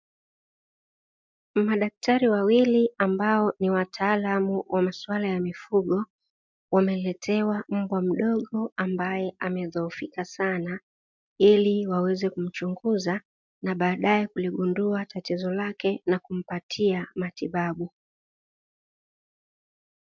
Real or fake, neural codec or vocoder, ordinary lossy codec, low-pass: real; none; MP3, 64 kbps; 7.2 kHz